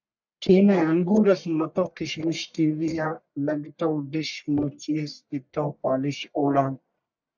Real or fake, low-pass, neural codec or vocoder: fake; 7.2 kHz; codec, 44.1 kHz, 1.7 kbps, Pupu-Codec